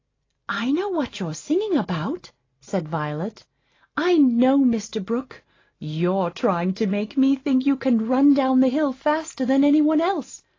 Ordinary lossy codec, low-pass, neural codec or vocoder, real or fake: AAC, 32 kbps; 7.2 kHz; none; real